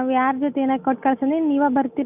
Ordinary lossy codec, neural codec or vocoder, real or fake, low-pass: none; none; real; 3.6 kHz